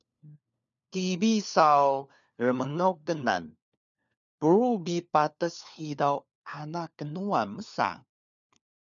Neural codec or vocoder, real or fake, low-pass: codec, 16 kHz, 4 kbps, FunCodec, trained on LibriTTS, 50 frames a second; fake; 7.2 kHz